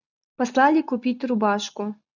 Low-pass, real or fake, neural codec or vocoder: 7.2 kHz; real; none